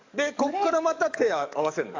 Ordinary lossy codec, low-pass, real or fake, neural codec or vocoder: AAC, 48 kbps; 7.2 kHz; fake; codec, 44.1 kHz, 7.8 kbps, DAC